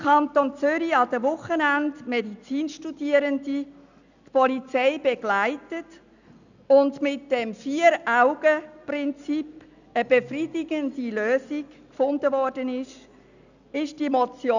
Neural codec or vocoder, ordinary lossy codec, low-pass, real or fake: none; none; 7.2 kHz; real